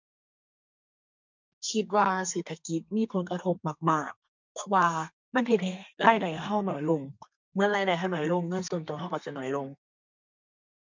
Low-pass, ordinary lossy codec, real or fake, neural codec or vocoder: 7.2 kHz; MP3, 64 kbps; fake; codec, 32 kHz, 1.9 kbps, SNAC